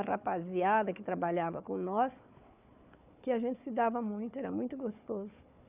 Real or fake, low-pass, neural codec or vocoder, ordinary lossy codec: fake; 3.6 kHz; codec, 16 kHz, 4 kbps, FunCodec, trained on Chinese and English, 50 frames a second; none